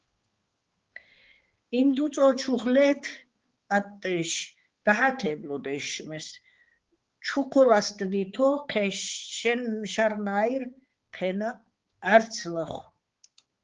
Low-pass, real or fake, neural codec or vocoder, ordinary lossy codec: 7.2 kHz; fake; codec, 16 kHz, 4 kbps, X-Codec, HuBERT features, trained on balanced general audio; Opus, 16 kbps